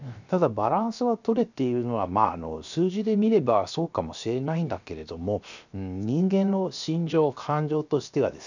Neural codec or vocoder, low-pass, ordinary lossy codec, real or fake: codec, 16 kHz, 0.7 kbps, FocalCodec; 7.2 kHz; none; fake